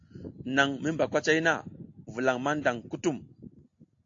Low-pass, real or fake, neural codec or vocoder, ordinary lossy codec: 7.2 kHz; real; none; AAC, 48 kbps